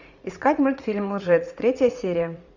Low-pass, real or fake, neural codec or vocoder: 7.2 kHz; fake; vocoder, 44.1 kHz, 80 mel bands, Vocos